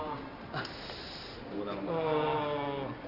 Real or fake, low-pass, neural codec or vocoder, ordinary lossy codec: real; 5.4 kHz; none; Opus, 64 kbps